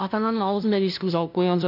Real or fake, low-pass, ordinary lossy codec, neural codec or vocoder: fake; 5.4 kHz; none; codec, 16 kHz, 0.5 kbps, FunCodec, trained on LibriTTS, 25 frames a second